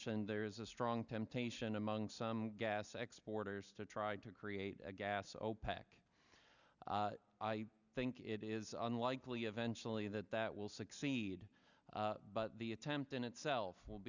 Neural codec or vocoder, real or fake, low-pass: none; real; 7.2 kHz